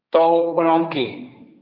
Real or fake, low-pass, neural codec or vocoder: fake; 5.4 kHz; codec, 16 kHz, 1.1 kbps, Voila-Tokenizer